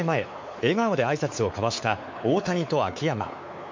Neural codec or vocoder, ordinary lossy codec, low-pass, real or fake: codec, 16 kHz, 4 kbps, X-Codec, WavLM features, trained on Multilingual LibriSpeech; MP3, 48 kbps; 7.2 kHz; fake